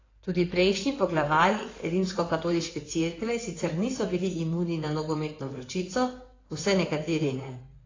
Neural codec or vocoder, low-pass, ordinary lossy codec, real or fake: codec, 16 kHz in and 24 kHz out, 2.2 kbps, FireRedTTS-2 codec; 7.2 kHz; AAC, 32 kbps; fake